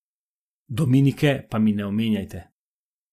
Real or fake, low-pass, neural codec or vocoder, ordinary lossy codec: real; 14.4 kHz; none; none